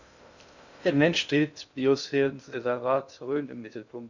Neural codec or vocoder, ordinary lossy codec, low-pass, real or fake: codec, 16 kHz in and 24 kHz out, 0.6 kbps, FocalCodec, streaming, 2048 codes; none; 7.2 kHz; fake